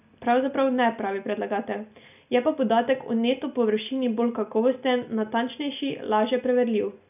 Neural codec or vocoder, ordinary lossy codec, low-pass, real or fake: none; none; 3.6 kHz; real